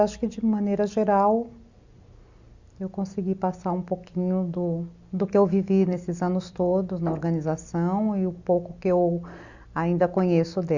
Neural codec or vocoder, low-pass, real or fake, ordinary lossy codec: none; 7.2 kHz; real; Opus, 64 kbps